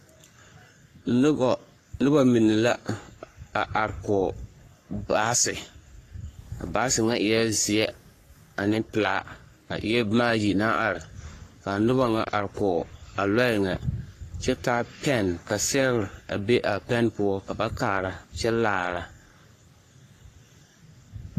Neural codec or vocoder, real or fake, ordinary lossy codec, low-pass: codec, 44.1 kHz, 3.4 kbps, Pupu-Codec; fake; AAC, 48 kbps; 14.4 kHz